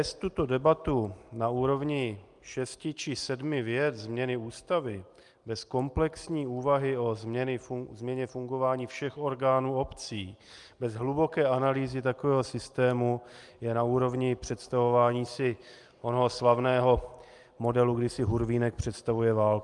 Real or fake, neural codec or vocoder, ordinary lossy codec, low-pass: real; none; Opus, 32 kbps; 10.8 kHz